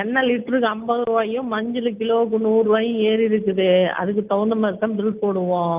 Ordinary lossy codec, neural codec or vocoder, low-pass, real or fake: Opus, 24 kbps; none; 3.6 kHz; real